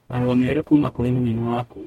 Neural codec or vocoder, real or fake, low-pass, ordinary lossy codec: codec, 44.1 kHz, 0.9 kbps, DAC; fake; 19.8 kHz; MP3, 64 kbps